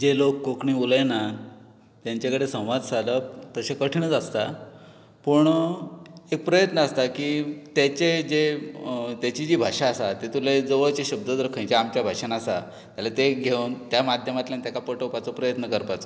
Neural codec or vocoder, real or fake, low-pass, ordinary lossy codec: none; real; none; none